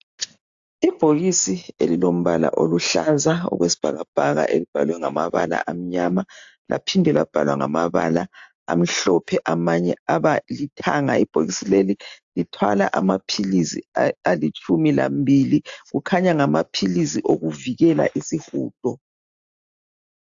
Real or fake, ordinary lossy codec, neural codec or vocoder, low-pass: real; AAC, 64 kbps; none; 7.2 kHz